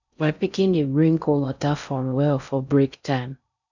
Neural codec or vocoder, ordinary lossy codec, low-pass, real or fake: codec, 16 kHz in and 24 kHz out, 0.6 kbps, FocalCodec, streaming, 4096 codes; none; 7.2 kHz; fake